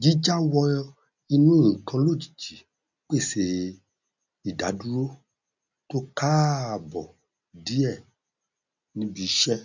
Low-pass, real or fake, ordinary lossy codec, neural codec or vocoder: 7.2 kHz; real; none; none